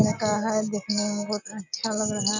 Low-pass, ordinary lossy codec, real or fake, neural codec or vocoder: 7.2 kHz; none; real; none